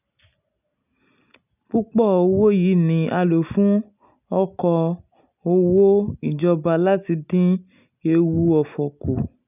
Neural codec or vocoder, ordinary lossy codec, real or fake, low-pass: none; none; real; 3.6 kHz